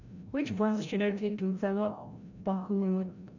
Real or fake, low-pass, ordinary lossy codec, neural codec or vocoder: fake; 7.2 kHz; none; codec, 16 kHz, 0.5 kbps, FreqCodec, larger model